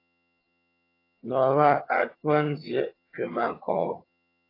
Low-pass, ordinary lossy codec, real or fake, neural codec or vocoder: 5.4 kHz; AAC, 24 kbps; fake; vocoder, 22.05 kHz, 80 mel bands, HiFi-GAN